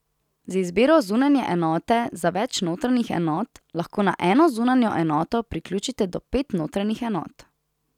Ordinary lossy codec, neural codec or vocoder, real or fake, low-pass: none; none; real; 19.8 kHz